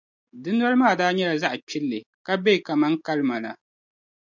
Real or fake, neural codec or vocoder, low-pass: real; none; 7.2 kHz